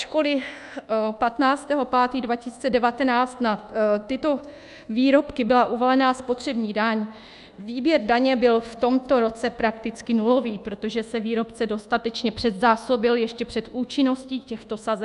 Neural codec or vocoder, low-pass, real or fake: codec, 24 kHz, 1.2 kbps, DualCodec; 10.8 kHz; fake